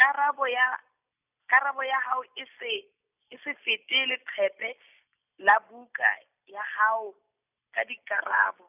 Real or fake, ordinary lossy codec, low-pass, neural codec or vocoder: real; none; 3.6 kHz; none